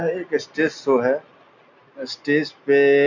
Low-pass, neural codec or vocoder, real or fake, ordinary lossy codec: 7.2 kHz; none; real; none